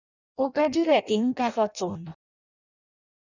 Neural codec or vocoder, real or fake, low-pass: codec, 16 kHz in and 24 kHz out, 0.6 kbps, FireRedTTS-2 codec; fake; 7.2 kHz